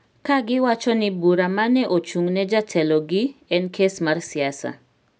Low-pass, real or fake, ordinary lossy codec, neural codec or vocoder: none; real; none; none